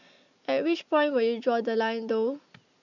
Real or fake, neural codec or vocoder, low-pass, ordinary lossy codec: real; none; 7.2 kHz; none